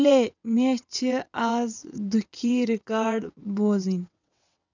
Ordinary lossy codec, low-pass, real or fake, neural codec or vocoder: none; 7.2 kHz; fake; vocoder, 22.05 kHz, 80 mel bands, WaveNeXt